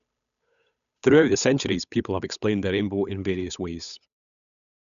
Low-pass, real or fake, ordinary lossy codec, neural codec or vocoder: 7.2 kHz; fake; none; codec, 16 kHz, 8 kbps, FunCodec, trained on Chinese and English, 25 frames a second